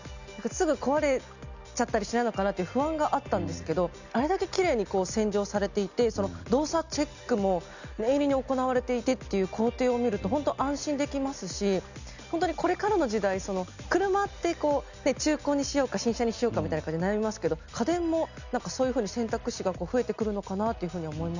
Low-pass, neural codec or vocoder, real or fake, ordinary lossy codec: 7.2 kHz; none; real; none